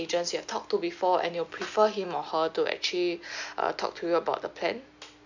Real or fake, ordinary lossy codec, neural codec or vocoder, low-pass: real; none; none; 7.2 kHz